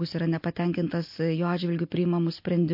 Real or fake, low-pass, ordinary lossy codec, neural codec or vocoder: real; 5.4 kHz; MP3, 32 kbps; none